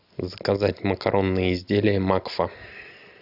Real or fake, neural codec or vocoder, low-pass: real; none; 5.4 kHz